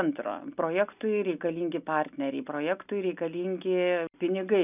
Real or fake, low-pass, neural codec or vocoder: real; 3.6 kHz; none